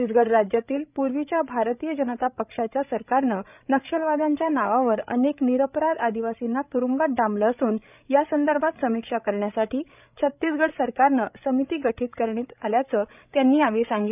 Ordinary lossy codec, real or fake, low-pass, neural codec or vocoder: none; fake; 3.6 kHz; codec, 16 kHz, 16 kbps, FreqCodec, larger model